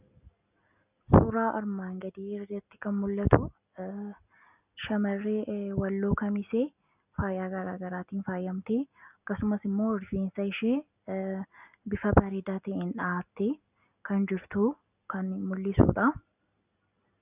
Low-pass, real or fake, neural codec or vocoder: 3.6 kHz; real; none